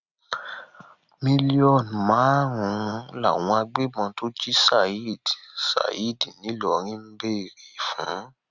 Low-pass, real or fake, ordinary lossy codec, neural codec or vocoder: 7.2 kHz; real; Opus, 64 kbps; none